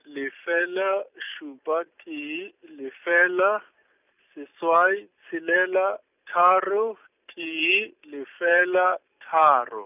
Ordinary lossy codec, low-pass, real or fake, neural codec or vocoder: none; 3.6 kHz; real; none